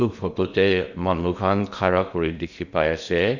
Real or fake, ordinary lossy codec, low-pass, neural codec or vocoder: fake; none; 7.2 kHz; codec, 16 kHz in and 24 kHz out, 0.8 kbps, FocalCodec, streaming, 65536 codes